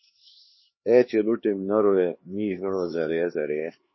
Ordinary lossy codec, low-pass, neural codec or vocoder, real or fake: MP3, 24 kbps; 7.2 kHz; codec, 16 kHz, 2 kbps, X-Codec, HuBERT features, trained on LibriSpeech; fake